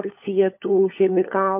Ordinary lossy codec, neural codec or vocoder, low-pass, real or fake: MP3, 32 kbps; codec, 16 kHz, 4 kbps, FunCodec, trained on LibriTTS, 50 frames a second; 3.6 kHz; fake